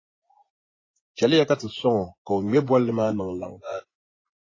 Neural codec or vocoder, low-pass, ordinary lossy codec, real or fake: vocoder, 24 kHz, 100 mel bands, Vocos; 7.2 kHz; AAC, 32 kbps; fake